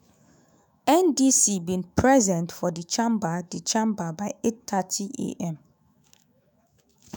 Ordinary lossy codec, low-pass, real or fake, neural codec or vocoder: none; none; fake; autoencoder, 48 kHz, 128 numbers a frame, DAC-VAE, trained on Japanese speech